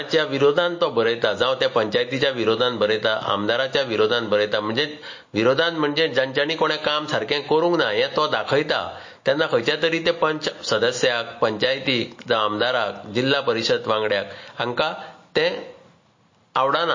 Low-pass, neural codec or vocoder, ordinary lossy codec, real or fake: 7.2 kHz; none; MP3, 32 kbps; real